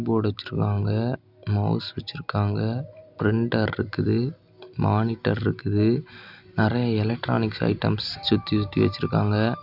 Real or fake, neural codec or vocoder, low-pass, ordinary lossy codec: real; none; 5.4 kHz; none